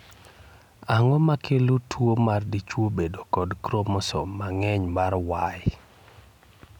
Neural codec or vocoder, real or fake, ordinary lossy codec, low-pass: none; real; none; 19.8 kHz